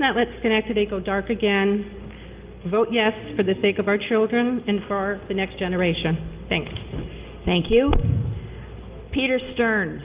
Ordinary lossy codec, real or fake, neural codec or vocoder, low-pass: Opus, 32 kbps; real; none; 3.6 kHz